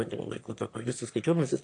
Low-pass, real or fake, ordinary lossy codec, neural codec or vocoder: 9.9 kHz; fake; AAC, 48 kbps; autoencoder, 22.05 kHz, a latent of 192 numbers a frame, VITS, trained on one speaker